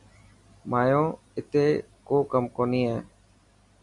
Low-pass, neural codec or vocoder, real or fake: 10.8 kHz; none; real